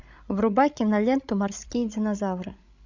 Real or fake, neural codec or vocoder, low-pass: fake; codec, 16 kHz, 16 kbps, FreqCodec, larger model; 7.2 kHz